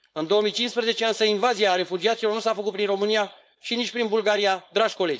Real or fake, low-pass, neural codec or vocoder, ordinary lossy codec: fake; none; codec, 16 kHz, 4.8 kbps, FACodec; none